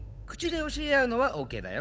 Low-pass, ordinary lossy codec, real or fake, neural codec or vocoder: none; none; fake; codec, 16 kHz, 8 kbps, FunCodec, trained on Chinese and English, 25 frames a second